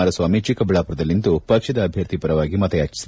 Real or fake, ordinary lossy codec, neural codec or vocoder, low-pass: real; none; none; none